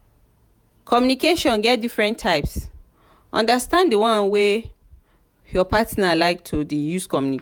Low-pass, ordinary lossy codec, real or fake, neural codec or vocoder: none; none; fake; vocoder, 48 kHz, 128 mel bands, Vocos